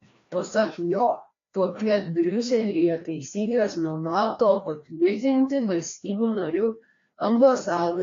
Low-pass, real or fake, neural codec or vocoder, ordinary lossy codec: 7.2 kHz; fake; codec, 16 kHz, 1 kbps, FreqCodec, larger model; AAC, 48 kbps